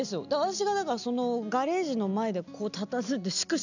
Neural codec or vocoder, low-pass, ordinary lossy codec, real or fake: none; 7.2 kHz; none; real